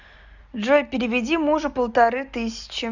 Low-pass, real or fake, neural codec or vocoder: 7.2 kHz; real; none